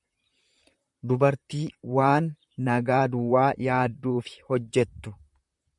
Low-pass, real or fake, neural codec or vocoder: 10.8 kHz; fake; vocoder, 44.1 kHz, 128 mel bands, Pupu-Vocoder